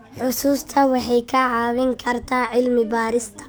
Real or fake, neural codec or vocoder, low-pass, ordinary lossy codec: fake; codec, 44.1 kHz, 7.8 kbps, DAC; none; none